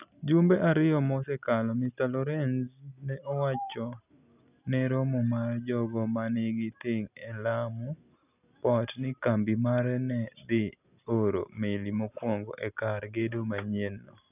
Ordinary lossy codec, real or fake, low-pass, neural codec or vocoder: none; real; 3.6 kHz; none